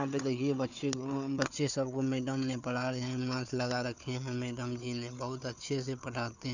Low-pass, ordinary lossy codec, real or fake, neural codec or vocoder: 7.2 kHz; none; fake; codec, 16 kHz, 4 kbps, FunCodec, trained on Chinese and English, 50 frames a second